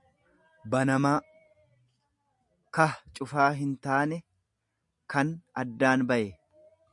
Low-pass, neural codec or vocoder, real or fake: 10.8 kHz; none; real